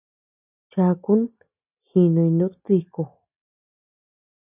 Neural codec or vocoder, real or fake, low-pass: none; real; 3.6 kHz